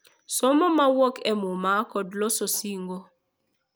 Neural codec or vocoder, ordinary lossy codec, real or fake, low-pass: none; none; real; none